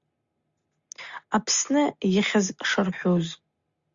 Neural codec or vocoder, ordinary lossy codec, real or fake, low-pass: none; Opus, 64 kbps; real; 7.2 kHz